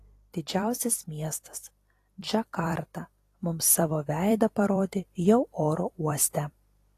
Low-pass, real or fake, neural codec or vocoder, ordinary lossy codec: 14.4 kHz; fake; vocoder, 44.1 kHz, 128 mel bands every 256 samples, BigVGAN v2; AAC, 48 kbps